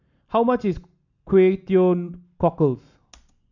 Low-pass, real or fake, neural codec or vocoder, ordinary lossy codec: 7.2 kHz; real; none; none